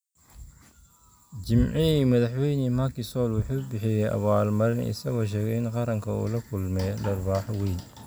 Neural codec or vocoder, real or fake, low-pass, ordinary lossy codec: none; real; none; none